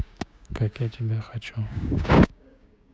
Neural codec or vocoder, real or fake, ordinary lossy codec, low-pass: codec, 16 kHz, 6 kbps, DAC; fake; none; none